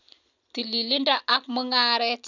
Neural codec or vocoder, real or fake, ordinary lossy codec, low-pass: none; real; none; 7.2 kHz